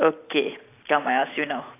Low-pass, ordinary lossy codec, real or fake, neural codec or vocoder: 3.6 kHz; AAC, 24 kbps; real; none